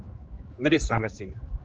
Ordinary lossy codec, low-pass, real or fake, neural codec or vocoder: Opus, 24 kbps; 7.2 kHz; fake; codec, 16 kHz, 2 kbps, X-Codec, HuBERT features, trained on general audio